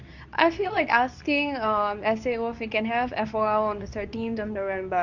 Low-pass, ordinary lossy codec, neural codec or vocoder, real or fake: 7.2 kHz; none; codec, 24 kHz, 0.9 kbps, WavTokenizer, medium speech release version 2; fake